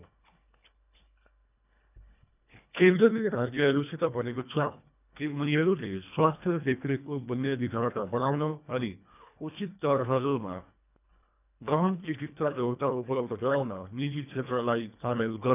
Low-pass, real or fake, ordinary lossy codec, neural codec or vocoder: 3.6 kHz; fake; none; codec, 24 kHz, 1.5 kbps, HILCodec